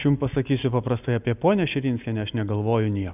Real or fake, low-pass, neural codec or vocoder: real; 3.6 kHz; none